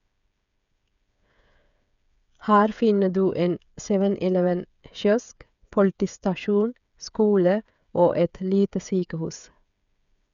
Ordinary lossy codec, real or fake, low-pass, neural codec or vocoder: none; fake; 7.2 kHz; codec, 16 kHz, 8 kbps, FreqCodec, smaller model